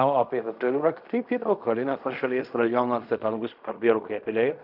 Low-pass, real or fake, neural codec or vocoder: 5.4 kHz; fake; codec, 16 kHz in and 24 kHz out, 0.4 kbps, LongCat-Audio-Codec, fine tuned four codebook decoder